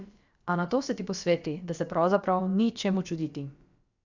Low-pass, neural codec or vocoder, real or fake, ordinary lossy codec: 7.2 kHz; codec, 16 kHz, about 1 kbps, DyCAST, with the encoder's durations; fake; Opus, 64 kbps